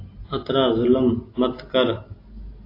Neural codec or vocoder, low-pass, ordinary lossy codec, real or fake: none; 5.4 kHz; AAC, 32 kbps; real